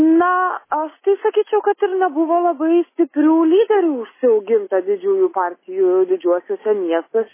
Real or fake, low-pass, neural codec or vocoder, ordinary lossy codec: real; 3.6 kHz; none; MP3, 16 kbps